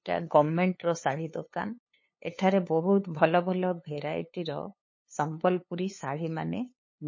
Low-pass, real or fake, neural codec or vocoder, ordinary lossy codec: 7.2 kHz; fake; codec, 16 kHz, 2 kbps, FunCodec, trained on LibriTTS, 25 frames a second; MP3, 32 kbps